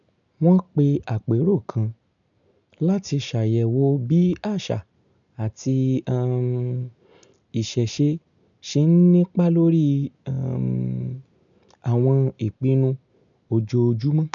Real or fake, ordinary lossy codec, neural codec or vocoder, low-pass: real; none; none; 7.2 kHz